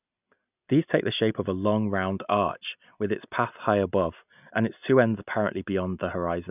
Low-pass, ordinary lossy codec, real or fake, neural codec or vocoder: 3.6 kHz; none; real; none